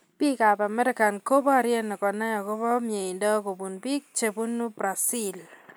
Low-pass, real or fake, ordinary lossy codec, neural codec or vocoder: none; real; none; none